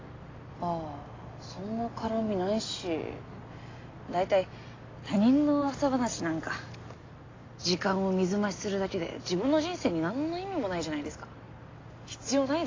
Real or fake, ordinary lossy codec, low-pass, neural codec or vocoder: real; AAC, 32 kbps; 7.2 kHz; none